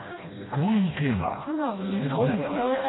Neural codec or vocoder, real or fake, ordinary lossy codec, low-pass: codec, 16 kHz, 1 kbps, FreqCodec, smaller model; fake; AAC, 16 kbps; 7.2 kHz